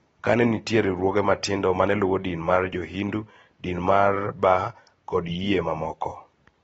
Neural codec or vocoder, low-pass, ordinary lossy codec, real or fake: none; 19.8 kHz; AAC, 24 kbps; real